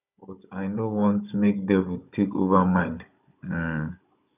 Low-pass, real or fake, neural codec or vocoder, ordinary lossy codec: 3.6 kHz; fake; codec, 16 kHz, 16 kbps, FunCodec, trained on Chinese and English, 50 frames a second; none